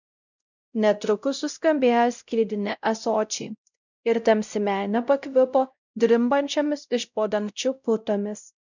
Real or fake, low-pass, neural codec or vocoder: fake; 7.2 kHz; codec, 16 kHz, 0.5 kbps, X-Codec, WavLM features, trained on Multilingual LibriSpeech